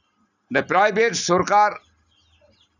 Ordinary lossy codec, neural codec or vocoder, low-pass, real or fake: none; none; 7.2 kHz; real